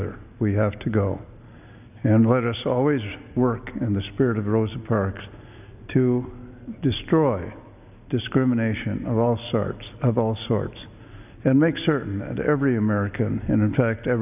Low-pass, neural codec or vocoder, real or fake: 3.6 kHz; none; real